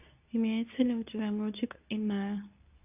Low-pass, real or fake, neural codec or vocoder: 3.6 kHz; fake; codec, 24 kHz, 0.9 kbps, WavTokenizer, medium speech release version 2